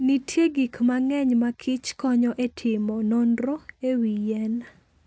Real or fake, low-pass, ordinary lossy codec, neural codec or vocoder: real; none; none; none